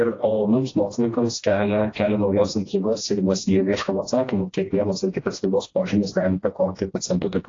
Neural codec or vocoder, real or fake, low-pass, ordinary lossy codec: codec, 16 kHz, 1 kbps, FreqCodec, smaller model; fake; 7.2 kHz; AAC, 32 kbps